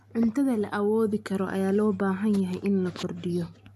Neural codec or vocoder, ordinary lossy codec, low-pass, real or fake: none; AAC, 96 kbps; 14.4 kHz; real